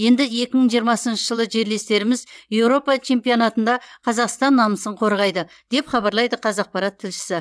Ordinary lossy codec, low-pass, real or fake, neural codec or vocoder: none; none; fake; vocoder, 22.05 kHz, 80 mel bands, WaveNeXt